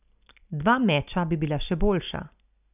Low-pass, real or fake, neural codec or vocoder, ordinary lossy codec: 3.6 kHz; real; none; none